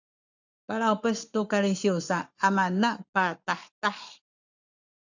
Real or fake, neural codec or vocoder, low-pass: fake; codec, 44.1 kHz, 7.8 kbps, Pupu-Codec; 7.2 kHz